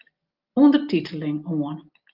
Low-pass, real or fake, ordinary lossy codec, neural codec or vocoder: 5.4 kHz; real; Opus, 32 kbps; none